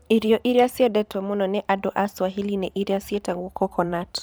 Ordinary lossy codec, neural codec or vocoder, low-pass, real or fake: none; vocoder, 44.1 kHz, 128 mel bands every 512 samples, BigVGAN v2; none; fake